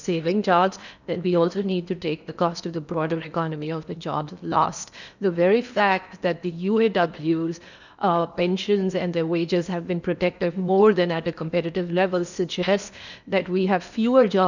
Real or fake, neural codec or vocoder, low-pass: fake; codec, 16 kHz in and 24 kHz out, 0.8 kbps, FocalCodec, streaming, 65536 codes; 7.2 kHz